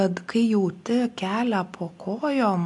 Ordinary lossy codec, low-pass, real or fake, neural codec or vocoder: MP3, 64 kbps; 10.8 kHz; real; none